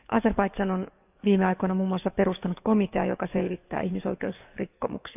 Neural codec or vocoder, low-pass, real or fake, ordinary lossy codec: codec, 44.1 kHz, 7.8 kbps, DAC; 3.6 kHz; fake; none